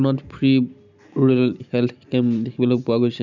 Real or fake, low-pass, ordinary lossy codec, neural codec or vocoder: real; 7.2 kHz; none; none